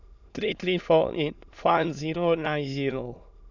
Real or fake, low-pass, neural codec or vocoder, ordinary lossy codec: fake; 7.2 kHz; autoencoder, 22.05 kHz, a latent of 192 numbers a frame, VITS, trained on many speakers; none